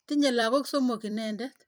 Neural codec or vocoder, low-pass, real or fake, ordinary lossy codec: vocoder, 44.1 kHz, 128 mel bands every 256 samples, BigVGAN v2; none; fake; none